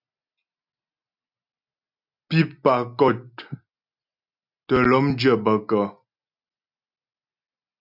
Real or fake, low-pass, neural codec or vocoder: real; 5.4 kHz; none